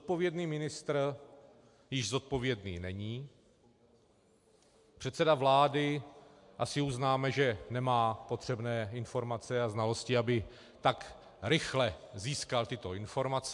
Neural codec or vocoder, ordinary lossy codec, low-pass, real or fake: none; MP3, 64 kbps; 10.8 kHz; real